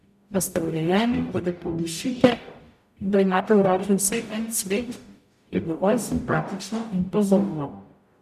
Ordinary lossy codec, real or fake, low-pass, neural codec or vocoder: none; fake; 14.4 kHz; codec, 44.1 kHz, 0.9 kbps, DAC